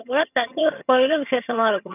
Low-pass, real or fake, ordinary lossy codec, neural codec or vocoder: 3.6 kHz; fake; none; vocoder, 22.05 kHz, 80 mel bands, HiFi-GAN